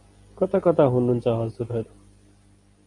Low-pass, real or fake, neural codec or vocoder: 10.8 kHz; real; none